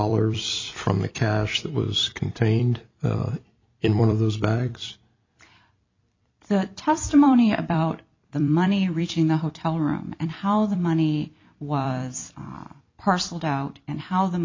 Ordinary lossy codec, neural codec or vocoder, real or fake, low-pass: MP3, 64 kbps; vocoder, 44.1 kHz, 128 mel bands every 256 samples, BigVGAN v2; fake; 7.2 kHz